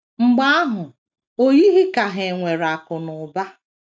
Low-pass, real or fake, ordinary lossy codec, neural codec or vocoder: none; real; none; none